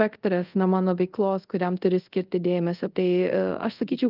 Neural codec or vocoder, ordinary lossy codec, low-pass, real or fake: codec, 24 kHz, 0.5 kbps, DualCodec; Opus, 32 kbps; 5.4 kHz; fake